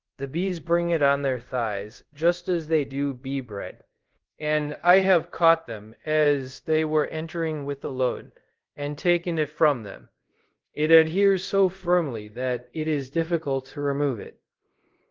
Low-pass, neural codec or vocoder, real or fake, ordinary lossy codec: 7.2 kHz; codec, 24 kHz, 0.5 kbps, DualCodec; fake; Opus, 16 kbps